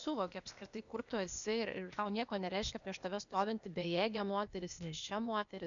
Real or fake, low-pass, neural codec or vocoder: fake; 7.2 kHz; codec, 16 kHz, 0.8 kbps, ZipCodec